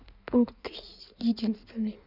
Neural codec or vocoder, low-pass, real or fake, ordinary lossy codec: codec, 16 kHz in and 24 kHz out, 1.1 kbps, FireRedTTS-2 codec; 5.4 kHz; fake; none